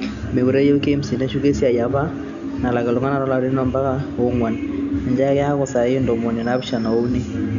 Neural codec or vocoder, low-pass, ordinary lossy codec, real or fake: none; 7.2 kHz; none; real